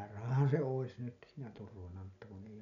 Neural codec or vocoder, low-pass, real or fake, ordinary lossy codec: none; 7.2 kHz; real; none